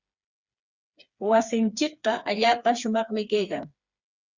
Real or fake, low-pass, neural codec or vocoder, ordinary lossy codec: fake; 7.2 kHz; codec, 16 kHz, 4 kbps, FreqCodec, smaller model; Opus, 64 kbps